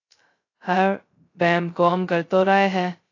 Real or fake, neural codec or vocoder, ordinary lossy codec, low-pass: fake; codec, 16 kHz, 0.2 kbps, FocalCodec; AAC, 32 kbps; 7.2 kHz